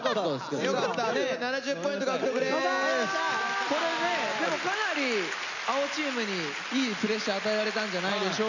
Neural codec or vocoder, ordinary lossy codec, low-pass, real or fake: none; none; 7.2 kHz; real